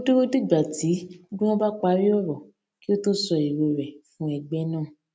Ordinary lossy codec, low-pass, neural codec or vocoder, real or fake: none; none; none; real